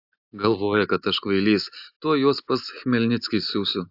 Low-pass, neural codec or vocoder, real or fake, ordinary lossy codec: 5.4 kHz; none; real; AAC, 48 kbps